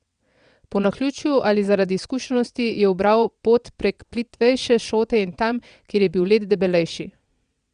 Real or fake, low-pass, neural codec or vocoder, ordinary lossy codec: fake; 9.9 kHz; vocoder, 22.05 kHz, 80 mel bands, WaveNeXt; Opus, 64 kbps